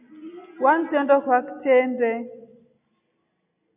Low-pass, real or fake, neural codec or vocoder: 3.6 kHz; real; none